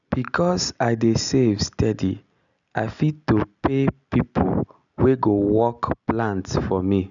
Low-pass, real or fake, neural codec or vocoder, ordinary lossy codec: 7.2 kHz; real; none; none